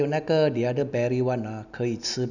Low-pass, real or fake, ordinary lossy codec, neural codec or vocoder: 7.2 kHz; real; none; none